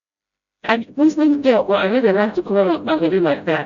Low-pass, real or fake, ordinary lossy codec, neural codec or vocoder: 7.2 kHz; fake; none; codec, 16 kHz, 0.5 kbps, FreqCodec, smaller model